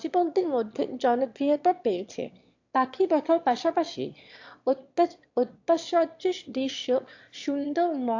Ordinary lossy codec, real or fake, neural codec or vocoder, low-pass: AAC, 48 kbps; fake; autoencoder, 22.05 kHz, a latent of 192 numbers a frame, VITS, trained on one speaker; 7.2 kHz